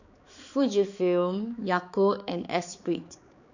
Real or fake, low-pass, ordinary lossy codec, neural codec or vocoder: fake; 7.2 kHz; none; codec, 16 kHz, 4 kbps, X-Codec, HuBERT features, trained on balanced general audio